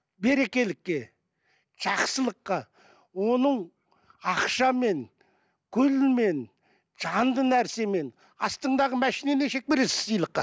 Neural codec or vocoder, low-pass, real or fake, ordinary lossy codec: none; none; real; none